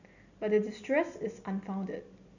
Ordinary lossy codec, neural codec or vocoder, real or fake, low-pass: none; none; real; 7.2 kHz